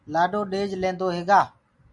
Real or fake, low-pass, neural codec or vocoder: real; 10.8 kHz; none